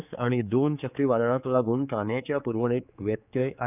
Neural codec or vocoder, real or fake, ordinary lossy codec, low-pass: codec, 16 kHz, 4 kbps, X-Codec, HuBERT features, trained on general audio; fake; none; 3.6 kHz